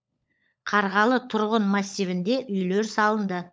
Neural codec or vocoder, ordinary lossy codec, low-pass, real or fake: codec, 16 kHz, 16 kbps, FunCodec, trained on LibriTTS, 50 frames a second; none; none; fake